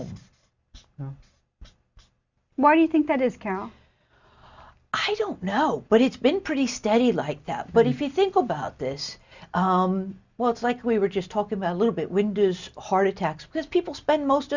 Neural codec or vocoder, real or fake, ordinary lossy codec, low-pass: none; real; Opus, 64 kbps; 7.2 kHz